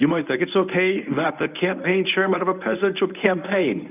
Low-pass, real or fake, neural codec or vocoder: 3.6 kHz; fake; codec, 24 kHz, 0.9 kbps, WavTokenizer, medium speech release version 1